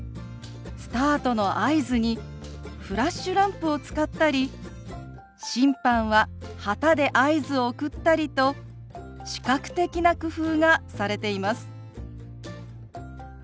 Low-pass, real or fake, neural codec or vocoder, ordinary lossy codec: none; real; none; none